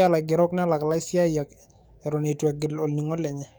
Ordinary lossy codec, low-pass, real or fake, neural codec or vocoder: none; none; fake; codec, 44.1 kHz, 7.8 kbps, DAC